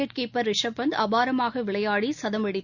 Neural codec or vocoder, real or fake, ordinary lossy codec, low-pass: none; real; Opus, 64 kbps; 7.2 kHz